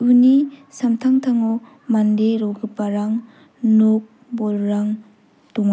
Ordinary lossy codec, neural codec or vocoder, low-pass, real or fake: none; none; none; real